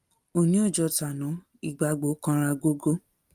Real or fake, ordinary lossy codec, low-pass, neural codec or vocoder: real; Opus, 32 kbps; 14.4 kHz; none